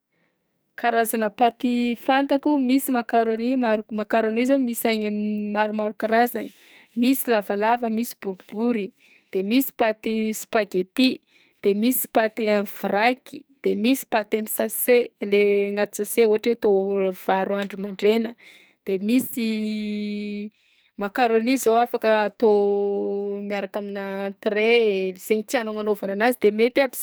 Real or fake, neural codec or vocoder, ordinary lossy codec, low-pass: fake; codec, 44.1 kHz, 2.6 kbps, SNAC; none; none